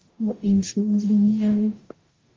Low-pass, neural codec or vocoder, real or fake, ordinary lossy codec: 7.2 kHz; codec, 44.1 kHz, 0.9 kbps, DAC; fake; Opus, 32 kbps